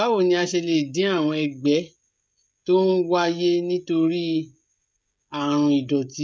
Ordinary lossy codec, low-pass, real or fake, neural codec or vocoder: none; none; fake; codec, 16 kHz, 16 kbps, FreqCodec, smaller model